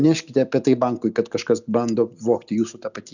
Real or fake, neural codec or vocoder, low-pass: real; none; 7.2 kHz